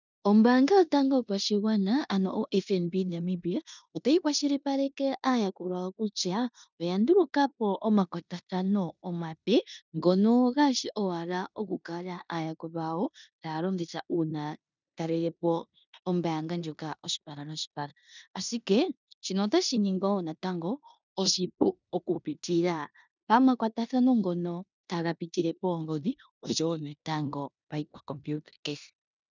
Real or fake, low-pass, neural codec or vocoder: fake; 7.2 kHz; codec, 16 kHz in and 24 kHz out, 0.9 kbps, LongCat-Audio-Codec, four codebook decoder